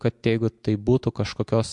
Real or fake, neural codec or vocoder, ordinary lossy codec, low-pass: real; none; MP3, 64 kbps; 10.8 kHz